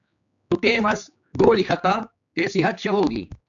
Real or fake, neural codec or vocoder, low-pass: fake; codec, 16 kHz, 4 kbps, X-Codec, HuBERT features, trained on general audio; 7.2 kHz